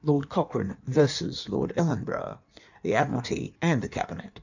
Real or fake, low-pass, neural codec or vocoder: fake; 7.2 kHz; codec, 16 kHz in and 24 kHz out, 1.1 kbps, FireRedTTS-2 codec